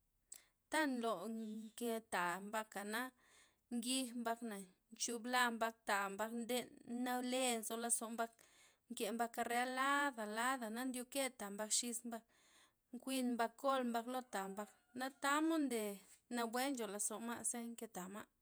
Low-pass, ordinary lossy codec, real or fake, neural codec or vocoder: none; none; fake; vocoder, 48 kHz, 128 mel bands, Vocos